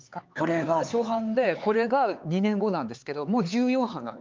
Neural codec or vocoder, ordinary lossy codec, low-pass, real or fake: codec, 16 kHz, 4 kbps, X-Codec, HuBERT features, trained on LibriSpeech; Opus, 32 kbps; 7.2 kHz; fake